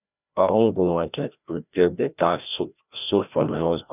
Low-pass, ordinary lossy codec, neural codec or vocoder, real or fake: 3.6 kHz; none; codec, 16 kHz, 1 kbps, FreqCodec, larger model; fake